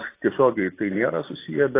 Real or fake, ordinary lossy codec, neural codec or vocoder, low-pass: fake; AAC, 24 kbps; vocoder, 44.1 kHz, 128 mel bands every 256 samples, BigVGAN v2; 3.6 kHz